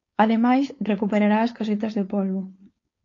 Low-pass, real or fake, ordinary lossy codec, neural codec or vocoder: 7.2 kHz; fake; AAC, 32 kbps; codec, 16 kHz, 4.8 kbps, FACodec